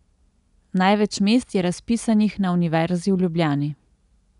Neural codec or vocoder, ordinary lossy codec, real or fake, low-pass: none; none; real; 10.8 kHz